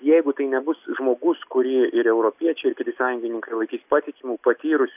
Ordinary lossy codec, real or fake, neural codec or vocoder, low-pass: AAC, 32 kbps; real; none; 3.6 kHz